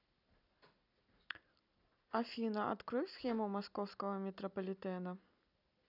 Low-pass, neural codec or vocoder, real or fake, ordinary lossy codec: 5.4 kHz; none; real; none